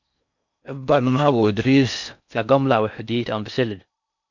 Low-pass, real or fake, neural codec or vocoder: 7.2 kHz; fake; codec, 16 kHz in and 24 kHz out, 0.6 kbps, FocalCodec, streaming, 4096 codes